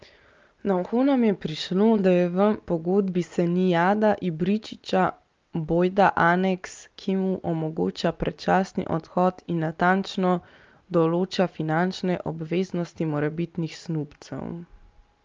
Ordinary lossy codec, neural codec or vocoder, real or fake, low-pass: Opus, 24 kbps; none; real; 7.2 kHz